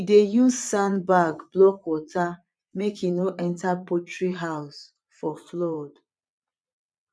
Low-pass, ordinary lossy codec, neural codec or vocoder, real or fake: none; none; vocoder, 22.05 kHz, 80 mel bands, Vocos; fake